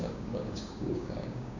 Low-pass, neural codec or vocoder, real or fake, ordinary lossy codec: 7.2 kHz; codec, 16 kHz, 6 kbps, DAC; fake; none